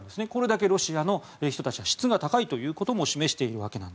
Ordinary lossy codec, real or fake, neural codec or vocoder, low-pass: none; real; none; none